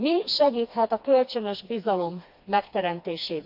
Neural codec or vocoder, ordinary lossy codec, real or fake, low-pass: codec, 16 kHz, 2 kbps, FreqCodec, smaller model; AAC, 48 kbps; fake; 5.4 kHz